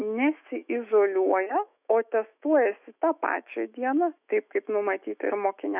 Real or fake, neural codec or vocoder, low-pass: fake; autoencoder, 48 kHz, 128 numbers a frame, DAC-VAE, trained on Japanese speech; 3.6 kHz